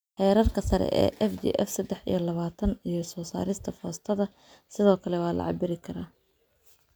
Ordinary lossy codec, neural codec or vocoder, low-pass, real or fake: none; none; none; real